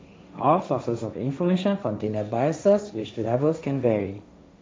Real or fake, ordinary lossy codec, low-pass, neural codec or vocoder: fake; none; none; codec, 16 kHz, 1.1 kbps, Voila-Tokenizer